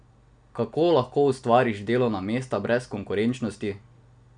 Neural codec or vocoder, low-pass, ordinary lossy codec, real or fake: none; 9.9 kHz; none; real